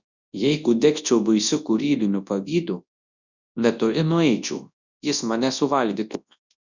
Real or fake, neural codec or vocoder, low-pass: fake; codec, 24 kHz, 0.9 kbps, WavTokenizer, large speech release; 7.2 kHz